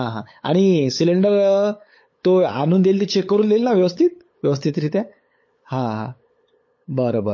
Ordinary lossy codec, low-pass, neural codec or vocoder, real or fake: MP3, 32 kbps; 7.2 kHz; codec, 16 kHz, 8 kbps, FunCodec, trained on LibriTTS, 25 frames a second; fake